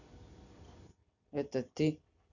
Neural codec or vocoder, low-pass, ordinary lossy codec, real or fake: none; 7.2 kHz; none; real